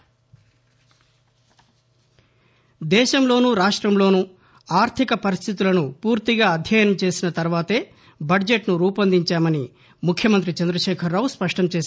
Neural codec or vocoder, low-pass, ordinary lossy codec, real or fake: none; none; none; real